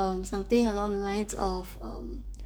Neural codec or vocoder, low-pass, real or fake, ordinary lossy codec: codec, 44.1 kHz, 2.6 kbps, SNAC; none; fake; none